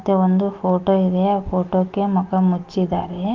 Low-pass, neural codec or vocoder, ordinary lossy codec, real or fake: 7.2 kHz; none; Opus, 24 kbps; real